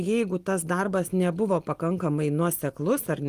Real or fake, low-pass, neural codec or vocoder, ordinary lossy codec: real; 14.4 kHz; none; Opus, 24 kbps